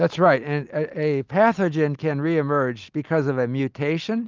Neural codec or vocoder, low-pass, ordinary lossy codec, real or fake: none; 7.2 kHz; Opus, 32 kbps; real